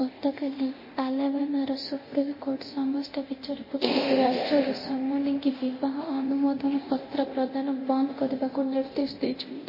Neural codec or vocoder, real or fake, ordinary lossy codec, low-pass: codec, 24 kHz, 0.9 kbps, DualCodec; fake; MP3, 24 kbps; 5.4 kHz